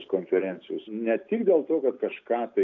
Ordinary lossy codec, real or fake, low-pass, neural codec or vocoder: AAC, 48 kbps; real; 7.2 kHz; none